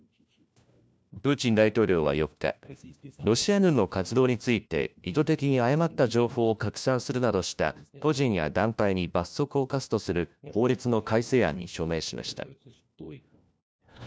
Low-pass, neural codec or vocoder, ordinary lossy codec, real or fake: none; codec, 16 kHz, 1 kbps, FunCodec, trained on LibriTTS, 50 frames a second; none; fake